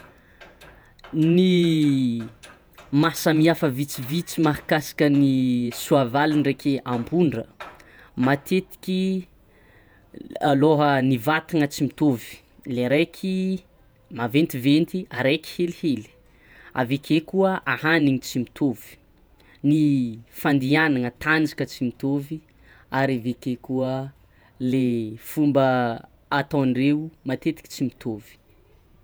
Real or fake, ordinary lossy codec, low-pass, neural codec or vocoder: fake; none; none; vocoder, 48 kHz, 128 mel bands, Vocos